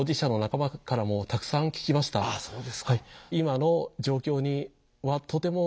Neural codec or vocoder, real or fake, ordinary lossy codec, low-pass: none; real; none; none